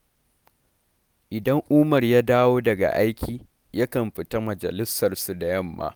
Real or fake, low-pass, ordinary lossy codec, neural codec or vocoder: real; none; none; none